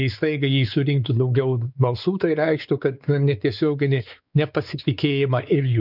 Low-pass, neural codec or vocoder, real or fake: 5.4 kHz; codec, 16 kHz, 4 kbps, X-Codec, WavLM features, trained on Multilingual LibriSpeech; fake